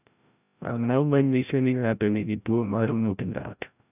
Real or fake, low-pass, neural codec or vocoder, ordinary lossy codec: fake; 3.6 kHz; codec, 16 kHz, 0.5 kbps, FreqCodec, larger model; none